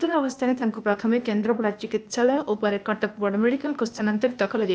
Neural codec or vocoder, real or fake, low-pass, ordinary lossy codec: codec, 16 kHz, 0.8 kbps, ZipCodec; fake; none; none